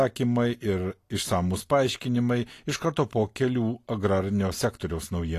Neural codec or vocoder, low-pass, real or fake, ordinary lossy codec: none; 14.4 kHz; real; AAC, 48 kbps